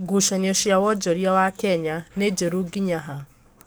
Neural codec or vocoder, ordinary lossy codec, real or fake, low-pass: codec, 44.1 kHz, 7.8 kbps, DAC; none; fake; none